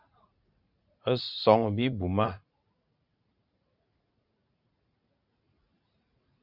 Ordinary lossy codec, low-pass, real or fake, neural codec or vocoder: Opus, 64 kbps; 5.4 kHz; fake; vocoder, 44.1 kHz, 80 mel bands, Vocos